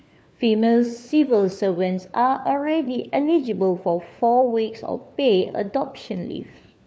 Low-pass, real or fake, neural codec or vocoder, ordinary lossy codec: none; fake; codec, 16 kHz, 4 kbps, FunCodec, trained on LibriTTS, 50 frames a second; none